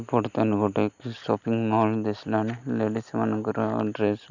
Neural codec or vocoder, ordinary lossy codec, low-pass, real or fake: none; none; 7.2 kHz; real